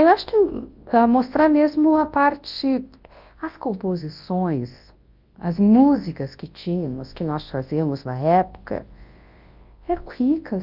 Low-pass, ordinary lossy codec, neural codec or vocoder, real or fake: 5.4 kHz; Opus, 32 kbps; codec, 24 kHz, 0.9 kbps, WavTokenizer, large speech release; fake